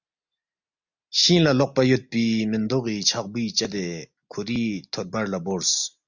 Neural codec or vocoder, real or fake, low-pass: none; real; 7.2 kHz